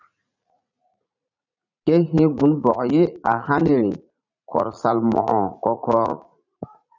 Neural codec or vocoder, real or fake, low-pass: vocoder, 44.1 kHz, 80 mel bands, Vocos; fake; 7.2 kHz